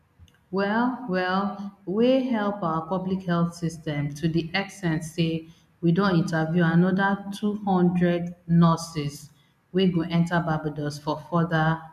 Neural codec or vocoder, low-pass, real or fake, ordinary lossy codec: none; 14.4 kHz; real; none